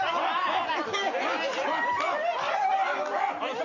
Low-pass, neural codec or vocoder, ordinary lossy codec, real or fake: 7.2 kHz; none; none; real